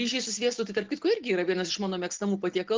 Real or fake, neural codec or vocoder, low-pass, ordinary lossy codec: real; none; 7.2 kHz; Opus, 16 kbps